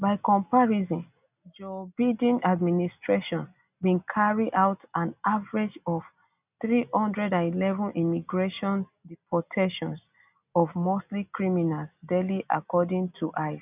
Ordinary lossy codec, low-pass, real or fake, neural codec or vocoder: none; 3.6 kHz; real; none